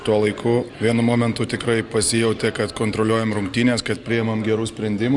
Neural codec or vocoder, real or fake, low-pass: none; real; 10.8 kHz